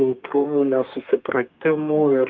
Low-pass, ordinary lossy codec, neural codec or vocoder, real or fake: 7.2 kHz; Opus, 24 kbps; codec, 32 kHz, 1.9 kbps, SNAC; fake